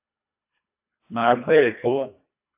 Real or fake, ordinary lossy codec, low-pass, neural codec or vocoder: fake; AAC, 24 kbps; 3.6 kHz; codec, 24 kHz, 1.5 kbps, HILCodec